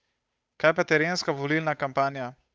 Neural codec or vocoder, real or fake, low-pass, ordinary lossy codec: codec, 16 kHz, 8 kbps, FunCodec, trained on Chinese and English, 25 frames a second; fake; none; none